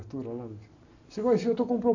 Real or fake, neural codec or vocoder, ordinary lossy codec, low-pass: real; none; none; 7.2 kHz